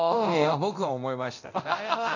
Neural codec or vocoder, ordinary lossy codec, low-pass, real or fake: codec, 24 kHz, 0.9 kbps, DualCodec; none; 7.2 kHz; fake